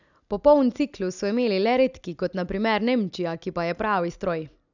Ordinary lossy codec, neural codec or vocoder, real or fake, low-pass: none; none; real; 7.2 kHz